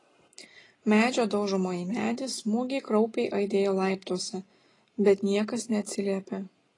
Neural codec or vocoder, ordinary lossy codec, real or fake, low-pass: none; AAC, 32 kbps; real; 10.8 kHz